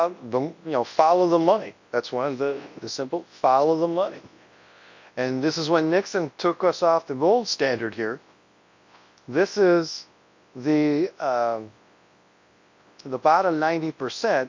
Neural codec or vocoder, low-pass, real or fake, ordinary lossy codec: codec, 24 kHz, 0.9 kbps, WavTokenizer, large speech release; 7.2 kHz; fake; MP3, 48 kbps